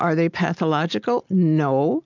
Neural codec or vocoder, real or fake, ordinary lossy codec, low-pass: none; real; MP3, 64 kbps; 7.2 kHz